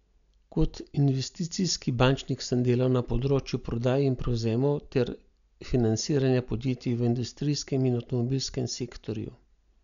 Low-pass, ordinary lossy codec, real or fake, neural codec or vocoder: 7.2 kHz; none; real; none